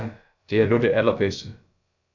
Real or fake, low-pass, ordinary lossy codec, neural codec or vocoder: fake; 7.2 kHz; MP3, 64 kbps; codec, 16 kHz, about 1 kbps, DyCAST, with the encoder's durations